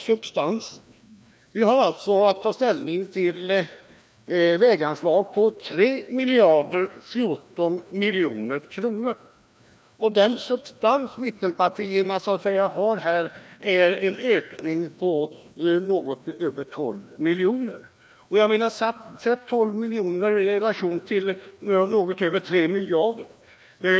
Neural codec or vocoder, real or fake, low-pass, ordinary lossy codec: codec, 16 kHz, 1 kbps, FreqCodec, larger model; fake; none; none